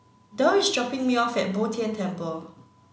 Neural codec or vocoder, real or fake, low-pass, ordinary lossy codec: none; real; none; none